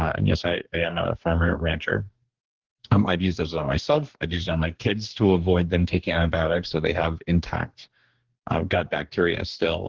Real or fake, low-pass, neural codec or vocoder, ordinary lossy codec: fake; 7.2 kHz; codec, 44.1 kHz, 2.6 kbps, DAC; Opus, 16 kbps